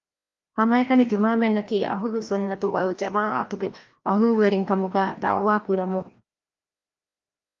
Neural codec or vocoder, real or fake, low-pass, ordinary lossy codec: codec, 16 kHz, 1 kbps, FreqCodec, larger model; fake; 7.2 kHz; Opus, 32 kbps